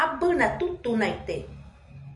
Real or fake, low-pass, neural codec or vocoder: real; 10.8 kHz; none